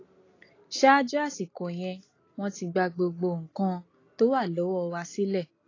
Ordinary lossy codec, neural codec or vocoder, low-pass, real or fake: AAC, 32 kbps; none; 7.2 kHz; real